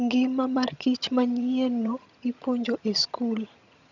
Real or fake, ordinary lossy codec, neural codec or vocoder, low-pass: fake; none; vocoder, 22.05 kHz, 80 mel bands, HiFi-GAN; 7.2 kHz